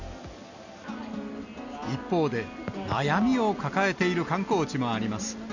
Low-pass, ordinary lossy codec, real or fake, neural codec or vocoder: 7.2 kHz; none; real; none